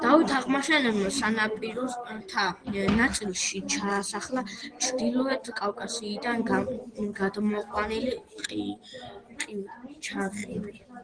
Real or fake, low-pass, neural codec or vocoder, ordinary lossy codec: real; 9.9 kHz; none; Opus, 16 kbps